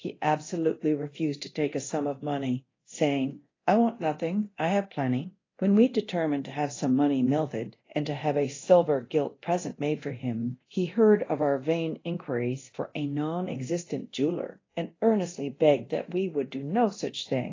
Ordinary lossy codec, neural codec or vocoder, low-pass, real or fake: AAC, 32 kbps; codec, 24 kHz, 0.9 kbps, DualCodec; 7.2 kHz; fake